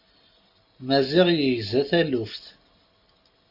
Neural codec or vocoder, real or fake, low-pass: none; real; 5.4 kHz